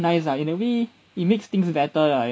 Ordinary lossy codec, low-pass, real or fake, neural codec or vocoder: none; none; real; none